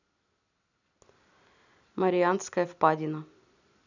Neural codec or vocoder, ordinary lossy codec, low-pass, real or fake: none; none; 7.2 kHz; real